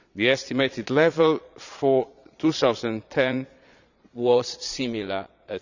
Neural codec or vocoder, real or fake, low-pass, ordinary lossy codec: vocoder, 22.05 kHz, 80 mel bands, Vocos; fake; 7.2 kHz; none